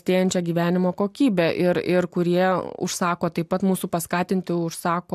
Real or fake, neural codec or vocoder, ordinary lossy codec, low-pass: real; none; AAC, 96 kbps; 14.4 kHz